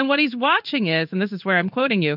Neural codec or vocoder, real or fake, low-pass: codec, 16 kHz in and 24 kHz out, 1 kbps, XY-Tokenizer; fake; 5.4 kHz